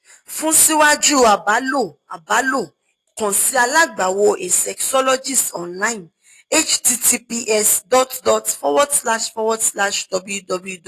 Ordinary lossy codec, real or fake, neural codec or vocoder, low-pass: AAC, 48 kbps; real; none; 14.4 kHz